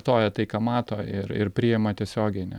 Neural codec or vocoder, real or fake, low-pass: none; real; 19.8 kHz